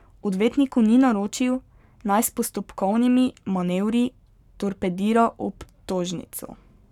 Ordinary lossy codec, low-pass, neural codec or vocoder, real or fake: none; 19.8 kHz; codec, 44.1 kHz, 7.8 kbps, Pupu-Codec; fake